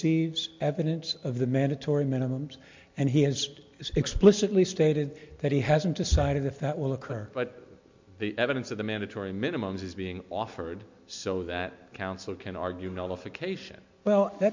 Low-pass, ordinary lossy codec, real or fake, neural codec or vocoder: 7.2 kHz; MP3, 48 kbps; real; none